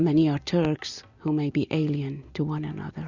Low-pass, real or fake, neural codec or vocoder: 7.2 kHz; real; none